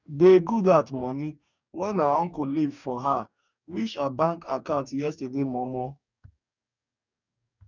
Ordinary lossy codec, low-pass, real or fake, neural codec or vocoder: none; 7.2 kHz; fake; codec, 44.1 kHz, 2.6 kbps, DAC